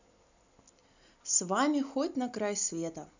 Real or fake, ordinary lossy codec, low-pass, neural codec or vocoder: real; none; 7.2 kHz; none